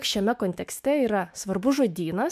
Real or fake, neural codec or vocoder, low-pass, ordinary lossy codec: fake; autoencoder, 48 kHz, 128 numbers a frame, DAC-VAE, trained on Japanese speech; 14.4 kHz; MP3, 96 kbps